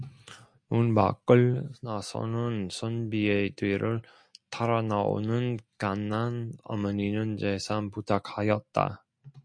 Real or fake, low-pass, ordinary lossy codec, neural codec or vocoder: real; 9.9 kHz; MP3, 64 kbps; none